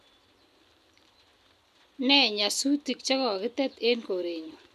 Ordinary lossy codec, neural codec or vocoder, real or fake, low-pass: none; none; real; 14.4 kHz